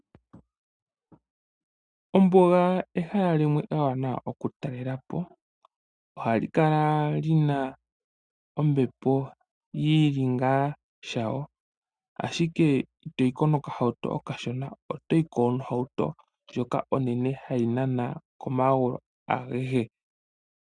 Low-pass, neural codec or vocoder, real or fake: 9.9 kHz; none; real